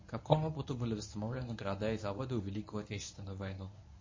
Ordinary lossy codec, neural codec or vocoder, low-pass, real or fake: MP3, 32 kbps; codec, 24 kHz, 0.9 kbps, WavTokenizer, medium speech release version 1; 7.2 kHz; fake